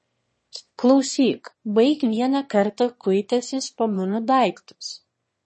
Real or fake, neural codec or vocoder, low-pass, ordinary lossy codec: fake; autoencoder, 22.05 kHz, a latent of 192 numbers a frame, VITS, trained on one speaker; 9.9 kHz; MP3, 32 kbps